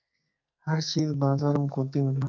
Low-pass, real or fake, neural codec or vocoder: 7.2 kHz; fake; codec, 44.1 kHz, 2.6 kbps, SNAC